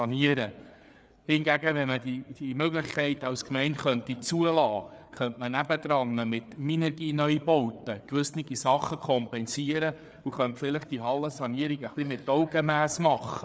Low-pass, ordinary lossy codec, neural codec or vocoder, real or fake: none; none; codec, 16 kHz, 4 kbps, FreqCodec, larger model; fake